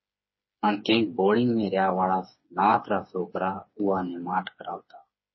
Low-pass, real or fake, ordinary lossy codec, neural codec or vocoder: 7.2 kHz; fake; MP3, 24 kbps; codec, 16 kHz, 4 kbps, FreqCodec, smaller model